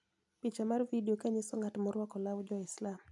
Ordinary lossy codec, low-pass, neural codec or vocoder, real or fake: none; 10.8 kHz; none; real